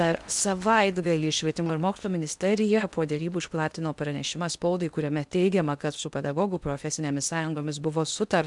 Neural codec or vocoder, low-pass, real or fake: codec, 16 kHz in and 24 kHz out, 0.8 kbps, FocalCodec, streaming, 65536 codes; 10.8 kHz; fake